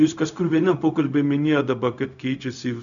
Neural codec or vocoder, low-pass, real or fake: codec, 16 kHz, 0.4 kbps, LongCat-Audio-Codec; 7.2 kHz; fake